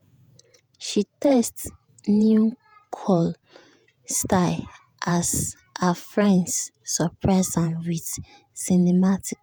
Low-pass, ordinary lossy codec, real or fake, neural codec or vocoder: none; none; fake; vocoder, 48 kHz, 128 mel bands, Vocos